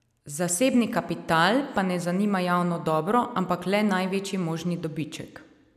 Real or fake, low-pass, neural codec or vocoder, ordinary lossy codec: real; 14.4 kHz; none; none